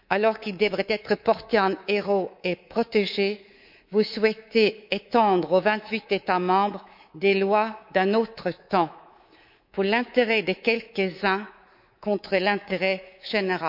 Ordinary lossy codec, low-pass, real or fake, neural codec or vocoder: none; 5.4 kHz; fake; codec, 24 kHz, 3.1 kbps, DualCodec